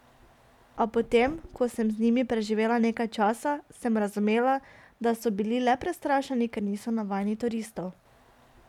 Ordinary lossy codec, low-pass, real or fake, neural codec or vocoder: none; 19.8 kHz; real; none